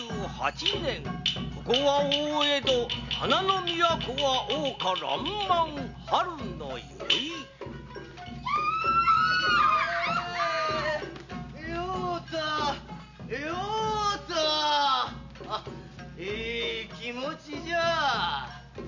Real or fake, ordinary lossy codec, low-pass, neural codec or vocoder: real; none; 7.2 kHz; none